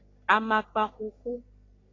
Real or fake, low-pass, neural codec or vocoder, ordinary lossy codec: fake; 7.2 kHz; codec, 44.1 kHz, 3.4 kbps, Pupu-Codec; AAC, 32 kbps